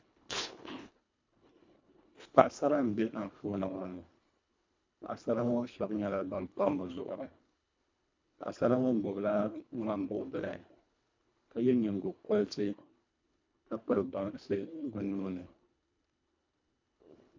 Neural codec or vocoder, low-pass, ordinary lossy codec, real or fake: codec, 24 kHz, 1.5 kbps, HILCodec; 7.2 kHz; MP3, 64 kbps; fake